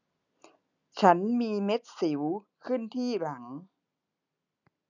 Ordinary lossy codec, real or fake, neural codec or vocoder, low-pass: none; real; none; 7.2 kHz